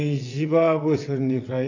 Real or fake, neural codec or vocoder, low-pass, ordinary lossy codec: fake; vocoder, 22.05 kHz, 80 mel bands, WaveNeXt; 7.2 kHz; AAC, 48 kbps